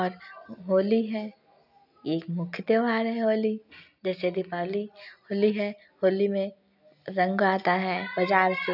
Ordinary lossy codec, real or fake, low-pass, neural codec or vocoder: none; real; 5.4 kHz; none